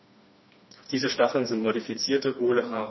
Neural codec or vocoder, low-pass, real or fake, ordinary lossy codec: codec, 16 kHz, 2 kbps, FreqCodec, smaller model; 7.2 kHz; fake; MP3, 24 kbps